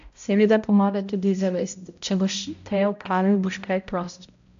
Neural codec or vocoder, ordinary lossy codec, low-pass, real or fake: codec, 16 kHz, 0.5 kbps, X-Codec, HuBERT features, trained on balanced general audio; AAC, 64 kbps; 7.2 kHz; fake